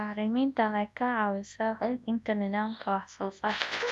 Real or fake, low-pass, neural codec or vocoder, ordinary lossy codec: fake; none; codec, 24 kHz, 0.9 kbps, WavTokenizer, large speech release; none